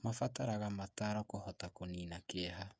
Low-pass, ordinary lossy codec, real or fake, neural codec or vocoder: none; none; fake; codec, 16 kHz, 16 kbps, FreqCodec, smaller model